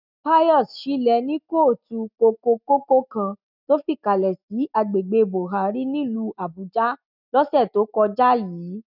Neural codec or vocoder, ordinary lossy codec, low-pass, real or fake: none; none; 5.4 kHz; real